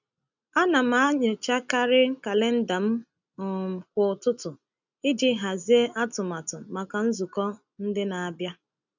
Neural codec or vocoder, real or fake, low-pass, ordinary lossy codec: none; real; 7.2 kHz; none